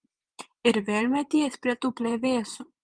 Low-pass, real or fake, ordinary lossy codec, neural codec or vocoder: 9.9 kHz; fake; Opus, 32 kbps; vocoder, 22.05 kHz, 80 mel bands, Vocos